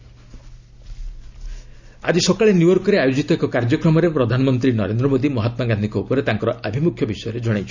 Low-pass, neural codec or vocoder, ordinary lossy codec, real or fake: 7.2 kHz; none; Opus, 64 kbps; real